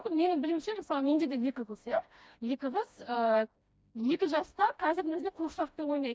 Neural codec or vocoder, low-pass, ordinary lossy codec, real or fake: codec, 16 kHz, 1 kbps, FreqCodec, smaller model; none; none; fake